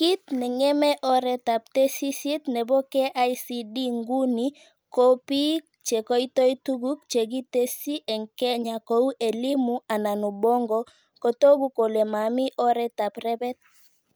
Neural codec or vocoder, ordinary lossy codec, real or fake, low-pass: none; none; real; none